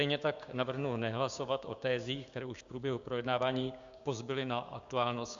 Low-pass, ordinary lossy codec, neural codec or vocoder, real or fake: 7.2 kHz; Opus, 64 kbps; codec, 16 kHz, 6 kbps, DAC; fake